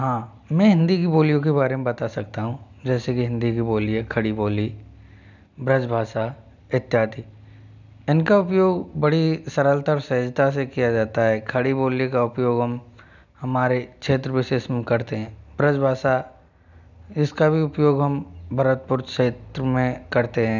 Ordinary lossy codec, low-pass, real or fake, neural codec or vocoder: none; 7.2 kHz; real; none